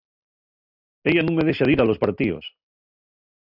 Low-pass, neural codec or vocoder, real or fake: 5.4 kHz; none; real